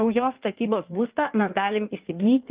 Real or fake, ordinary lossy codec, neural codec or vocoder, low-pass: fake; Opus, 32 kbps; codec, 16 kHz in and 24 kHz out, 1.1 kbps, FireRedTTS-2 codec; 3.6 kHz